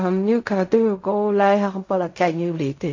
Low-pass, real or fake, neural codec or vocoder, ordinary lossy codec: 7.2 kHz; fake; codec, 16 kHz in and 24 kHz out, 0.4 kbps, LongCat-Audio-Codec, fine tuned four codebook decoder; AAC, 48 kbps